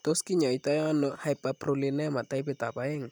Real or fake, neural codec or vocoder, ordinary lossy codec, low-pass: real; none; none; 19.8 kHz